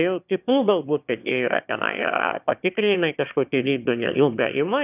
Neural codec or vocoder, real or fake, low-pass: autoencoder, 22.05 kHz, a latent of 192 numbers a frame, VITS, trained on one speaker; fake; 3.6 kHz